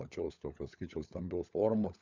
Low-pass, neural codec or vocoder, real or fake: 7.2 kHz; codec, 16 kHz, 16 kbps, FunCodec, trained on LibriTTS, 50 frames a second; fake